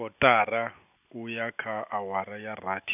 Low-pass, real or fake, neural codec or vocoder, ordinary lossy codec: 3.6 kHz; real; none; none